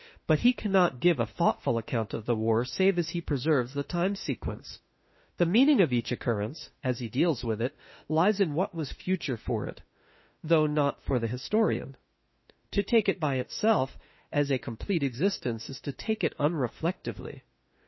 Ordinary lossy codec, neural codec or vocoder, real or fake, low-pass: MP3, 24 kbps; autoencoder, 48 kHz, 32 numbers a frame, DAC-VAE, trained on Japanese speech; fake; 7.2 kHz